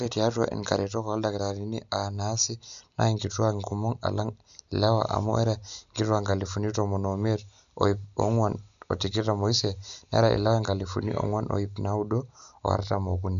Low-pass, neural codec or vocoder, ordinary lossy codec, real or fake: 7.2 kHz; none; none; real